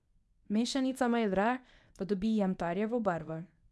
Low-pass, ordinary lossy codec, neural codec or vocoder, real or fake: none; none; codec, 24 kHz, 0.9 kbps, WavTokenizer, medium speech release version 1; fake